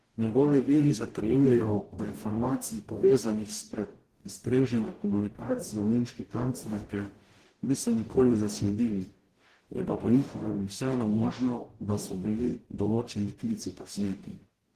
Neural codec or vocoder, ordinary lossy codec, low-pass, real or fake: codec, 44.1 kHz, 0.9 kbps, DAC; Opus, 16 kbps; 14.4 kHz; fake